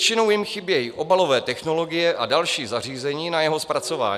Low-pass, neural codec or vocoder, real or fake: 14.4 kHz; none; real